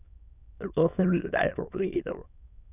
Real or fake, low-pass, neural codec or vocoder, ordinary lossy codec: fake; 3.6 kHz; autoencoder, 22.05 kHz, a latent of 192 numbers a frame, VITS, trained on many speakers; none